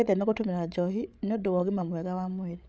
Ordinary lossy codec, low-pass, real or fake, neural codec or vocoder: none; none; fake; codec, 16 kHz, 16 kbps, FreqCodec, larger model